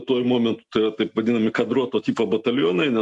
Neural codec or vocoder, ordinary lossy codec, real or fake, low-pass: none; MP3, 64 kbps; real; 10.8 kHz